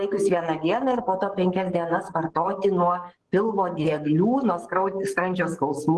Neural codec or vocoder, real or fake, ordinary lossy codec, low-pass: codec, 44.1 kHz, 7.8 kbps, DAC; fake; Opus, 16 kbps; 10.8 kHz